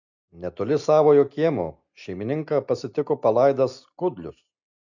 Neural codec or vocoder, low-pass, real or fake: none; 7.2 kHz; real